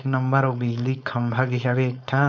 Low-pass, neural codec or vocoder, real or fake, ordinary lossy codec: none; codec, 16 kHz, 4.8 kbps, FACodec; fake; none